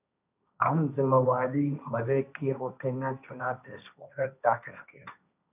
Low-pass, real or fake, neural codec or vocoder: 3.6 kHz; fake; codec, 16 kHz, 1.1 kbps, Voila-Tokenizer